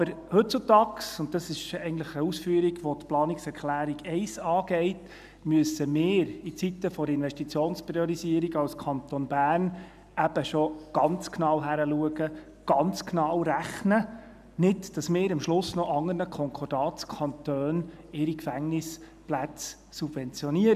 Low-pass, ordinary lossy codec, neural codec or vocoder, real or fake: 14.4 kHz; none; none; real